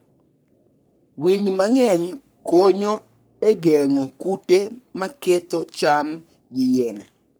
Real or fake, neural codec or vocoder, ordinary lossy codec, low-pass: fake; codec, 44.1 kHz, 3.4 kbps, Pupu-Codec; none; none